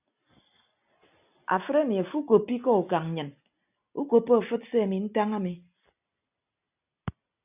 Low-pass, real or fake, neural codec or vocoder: 3.6 kHz; real; none